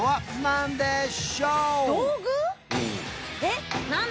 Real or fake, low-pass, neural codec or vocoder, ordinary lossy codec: real; none; none; none